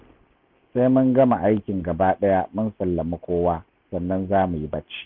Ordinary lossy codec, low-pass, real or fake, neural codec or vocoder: none; 5.4 kHz; real; none